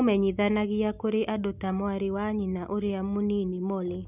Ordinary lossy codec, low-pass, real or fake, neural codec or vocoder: none; 3.6 kHz; real; none